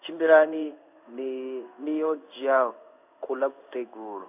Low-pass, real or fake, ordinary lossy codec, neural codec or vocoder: 3.6 kHz; fake; none; codec, 16 kHz in and 24 kHz out, 1 kbps, XY-Tokenizer